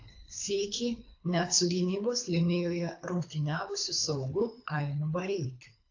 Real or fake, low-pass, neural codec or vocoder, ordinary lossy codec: fake; 7.2 kHz; codec, 24 kHz, 3 kbps, HILCodec; AAC, 48 kbps